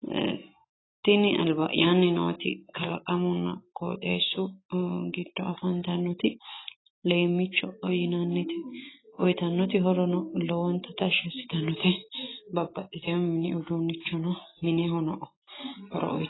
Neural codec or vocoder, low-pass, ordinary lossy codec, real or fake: none; 7.2 kHz; AAC, 16 kbps; real